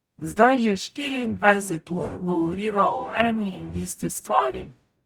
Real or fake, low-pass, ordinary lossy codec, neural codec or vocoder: fake; 19.8 kHz; Opus, 64 kbps; codec, 44.1 kHz, 0.9 kbps, DAC